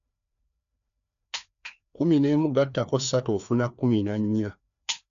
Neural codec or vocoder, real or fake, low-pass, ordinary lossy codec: codec, 16 kHz, 2 kbps, FreqCodec, larger model; fake; 7.2 kHz; none